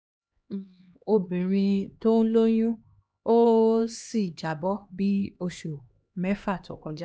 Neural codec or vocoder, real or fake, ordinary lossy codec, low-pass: codec, 16 kHz, 2 kbps, X-Codec, HuBERT features, trained on LibriSpeech; fake; none; none